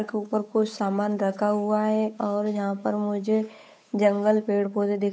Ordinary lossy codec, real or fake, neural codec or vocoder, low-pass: none; fake; codec, 16 kHz, 8 kbps, FunCodec, trained on Chinese and English, 25 frames a second; none